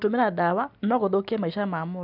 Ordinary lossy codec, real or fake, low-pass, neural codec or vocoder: none; real; 5.4 kHz; none